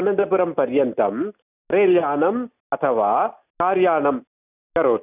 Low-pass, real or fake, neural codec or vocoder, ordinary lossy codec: 3.6 kHz; real; none; none